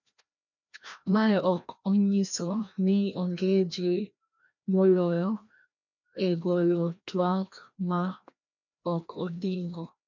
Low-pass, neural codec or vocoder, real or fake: 7.2 kHz; codec, 16 kHz, 1 kbps, FreqCodec, larger model; fake